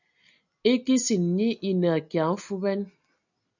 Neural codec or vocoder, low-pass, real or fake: none; 7.2 kHz; real